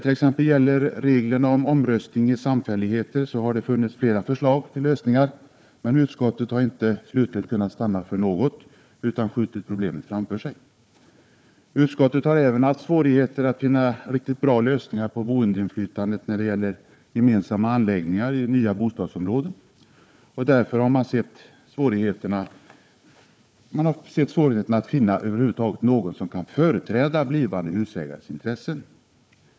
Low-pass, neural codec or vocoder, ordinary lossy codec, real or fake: none; codec, 16 kHz, 4 kbps, FunCodec, trained on Chinese and English, 50 frames a second; none; fake